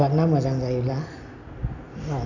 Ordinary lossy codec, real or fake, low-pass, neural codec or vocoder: none; real; 7.2 kHz; none